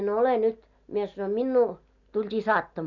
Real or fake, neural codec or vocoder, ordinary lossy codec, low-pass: real; none; none; 7.2 kHz